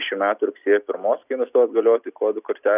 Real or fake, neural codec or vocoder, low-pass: real; none; 3.6 kHz